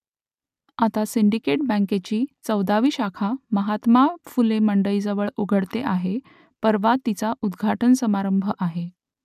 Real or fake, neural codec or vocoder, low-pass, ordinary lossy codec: real; none; 14.4 kHz; none